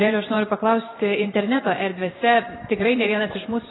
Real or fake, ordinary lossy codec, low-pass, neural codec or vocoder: fake; AAC, 16 kbps; 7.2 kHz; vocoder, 44.1 kHz, 128 mel bands every 512 samples, BigVGAN v2